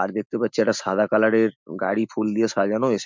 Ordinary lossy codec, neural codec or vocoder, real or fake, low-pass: MP3, 64 kbps; none; real; 7.2 kHz